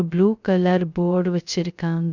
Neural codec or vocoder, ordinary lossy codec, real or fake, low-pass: codec, 16 kHz, 0.2 kbps, FocalCodec; none; fake; 7.2 kHz